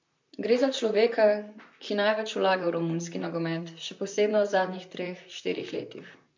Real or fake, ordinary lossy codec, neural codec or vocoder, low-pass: fake; MP3, 48 kbps; vocoder, 44.1 kHz, 128 mel bands, Pupu-Vocoder; 7.2 kHz